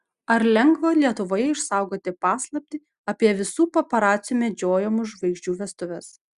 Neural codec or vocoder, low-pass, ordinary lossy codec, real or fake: none; 10.8 kHz; Opus, 64 kbps; real